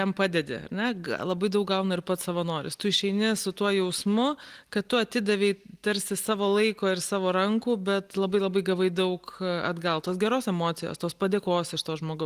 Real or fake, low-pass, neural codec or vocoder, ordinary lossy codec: real; 14.4 kHz; none; Opus, 24 kbps